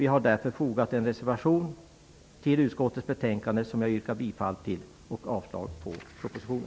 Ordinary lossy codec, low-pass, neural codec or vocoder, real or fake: none; none; none; real